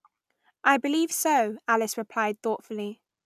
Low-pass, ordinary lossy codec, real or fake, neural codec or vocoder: 14.4 kHz; none; real; none